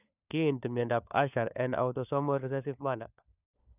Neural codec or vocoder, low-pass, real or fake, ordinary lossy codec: codec, 16 kHz, 4 kbps, FunCodec, trained on LibriTTS, 50 frames a second; 3.6 kHz; fake; none